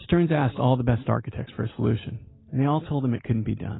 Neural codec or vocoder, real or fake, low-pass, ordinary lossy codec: none; real; 7.2 kHz; AAC, 16 kbps